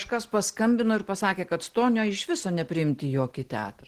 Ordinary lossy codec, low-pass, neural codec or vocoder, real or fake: Opus, 16 kbps; 14.4 kHz; none; real